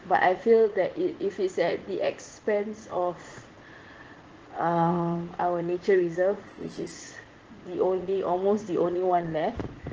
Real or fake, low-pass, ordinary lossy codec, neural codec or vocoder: fake; 7.2 kHz; Opus, 16 kbps; vocoder, 44.1 kHz, 80 mel bands, Vocos